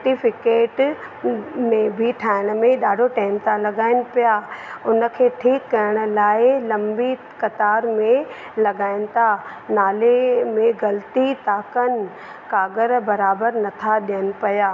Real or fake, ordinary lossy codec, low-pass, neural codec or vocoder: real; none; none; none